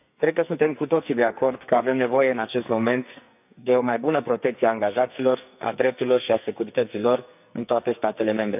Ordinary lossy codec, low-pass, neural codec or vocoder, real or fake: none; 3.6 kHz; codec, 44.1 kHz, 2.6 kbps, SNAC; fake